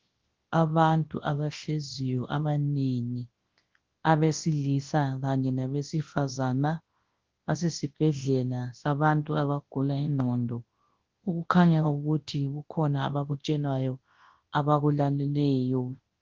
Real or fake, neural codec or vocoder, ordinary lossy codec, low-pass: fake; codec, 24 kHz, 0.9 kbps, WavTokenizer, large speech release; Opus, 16 kbps; 7.2 kHz